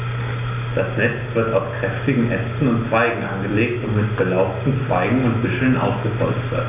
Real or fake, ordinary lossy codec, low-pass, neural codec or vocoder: real; AAC, 32 kbps; 3.6 kHz; none